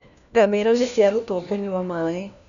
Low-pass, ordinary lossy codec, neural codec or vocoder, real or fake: 7.2 kHz; none; codec, 16 kHz, 1 kbps, FunCodec, trained on LibriTTS, 50 frames a second; fake